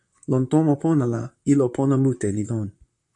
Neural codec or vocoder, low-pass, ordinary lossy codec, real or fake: vocoder, 44.1 kHz, 128 mel bands, Pupu-Vocoder; 10.8 kHz; AAC, 64 kbps; fake